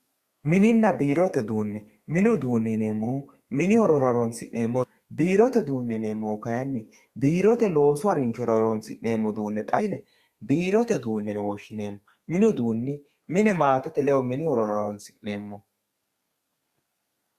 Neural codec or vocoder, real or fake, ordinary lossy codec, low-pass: codec, 32 kHz, 1.9 kbps, SNAC; fake; Opus, 64 kbps; 14.4 kHz